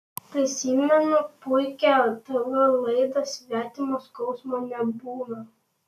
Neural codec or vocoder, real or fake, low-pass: none; real; 14.4 kHz